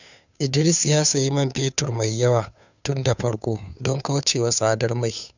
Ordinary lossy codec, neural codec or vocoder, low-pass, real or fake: none; codec, 16 kHz, 2 kbps, FunCodec, trained on Chinese and English, 25 frames a second; 7.2 kHz; fake